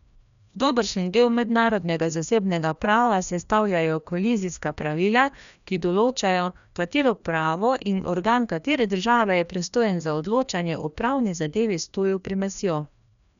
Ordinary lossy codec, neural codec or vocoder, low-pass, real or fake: none; codec, 16 kHz, 1 kbps, FreqCodec, larger model; 7.2 kHz; fake